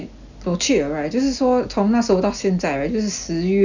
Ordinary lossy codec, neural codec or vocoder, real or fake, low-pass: none; none; real; 7.2 kHz